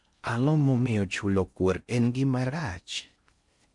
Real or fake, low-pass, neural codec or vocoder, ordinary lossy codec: fake; 10.8 kHz; codec, 16 kHz in and 24 kHz out, 0.6 kbps, FocalCodec, streaming, 4096 codes; MP3, 64 kbps